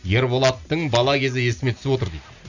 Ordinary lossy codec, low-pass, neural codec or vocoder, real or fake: none; 7.2 kHz; none; real